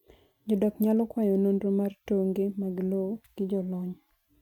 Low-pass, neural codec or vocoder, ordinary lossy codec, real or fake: 19.8 kHz; none; MP3, 96 kbps; real